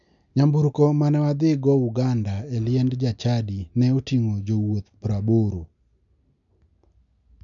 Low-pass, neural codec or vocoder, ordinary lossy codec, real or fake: 7.2 kHz; none; none; real